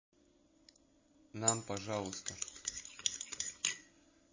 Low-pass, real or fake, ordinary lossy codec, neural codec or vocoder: 7.2 kHz; real; MP3, 32 kbps; none